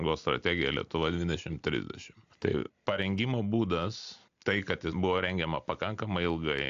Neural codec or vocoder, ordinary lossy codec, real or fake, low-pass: none; AAC, 64 kbps; real; 7.2 kHz